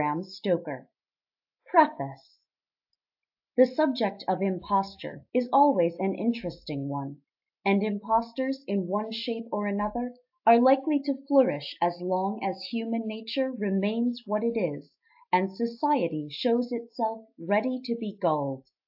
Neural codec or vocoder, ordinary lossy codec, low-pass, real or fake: none; AAC, 48 kbps; 5.4 kHz; real